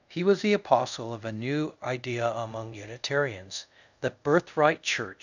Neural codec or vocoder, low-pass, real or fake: codec, 24 kHz, 0.5 kbps, DualCodec; 7.2 kHz; fake